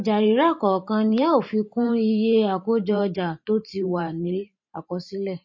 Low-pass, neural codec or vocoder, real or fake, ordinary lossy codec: 7.2 kHz; vocoder, 44.1 kHz, 128 mel bands every 512 samples, BigVGAN v2; fake; MP3, 32 kbps